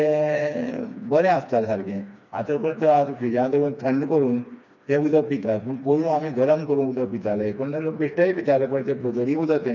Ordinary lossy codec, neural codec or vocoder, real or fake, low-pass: none; codec, 16 kHz, 2 kbps, FreqCodec, smaller model; fake; 7.2 kHz